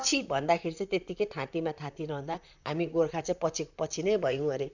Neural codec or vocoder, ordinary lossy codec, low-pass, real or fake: vocoder, 44.1 kHz, 128 mel bands, Pupu-Vocoder; none; 7.2 kHz; fake